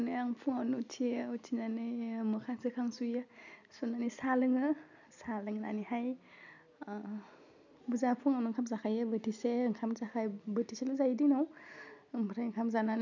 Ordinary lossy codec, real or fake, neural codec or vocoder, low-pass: none; real; none; 7.2 kHz